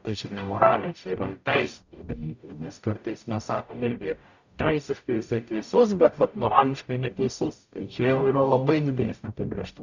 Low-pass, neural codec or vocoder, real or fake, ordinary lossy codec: 7.2 kHz; codec, 44.1 kHz, 0.9 kbps, DAC; fake; Opus, 64 kbps